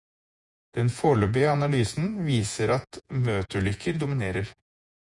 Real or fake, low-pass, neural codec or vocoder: fake; 10.8 kHz; vocoder, 48 kHz, 128 mel bands, Vocos